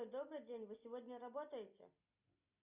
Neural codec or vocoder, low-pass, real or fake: none; 3.6 kHz; real